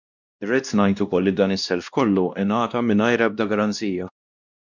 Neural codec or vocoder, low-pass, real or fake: codec, 16 kHz, 1 kbps, X-Codec, WavLM features, trained on Multilingual LibriSpeech; 7.2 kHz; fake